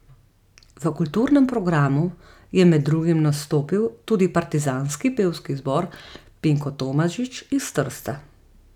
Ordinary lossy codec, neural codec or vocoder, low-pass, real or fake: none; none; 19.8 kHz; real